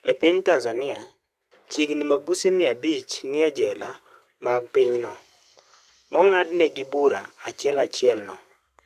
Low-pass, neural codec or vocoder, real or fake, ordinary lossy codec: 14.4 kHz; codec, 32 kHz, 1.9 kbps, SNAC; fake; AAC, 96 kbps